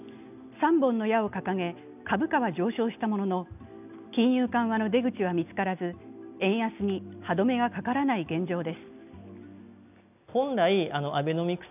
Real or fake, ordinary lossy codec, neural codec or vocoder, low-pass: real; none; none; 3.6 kHz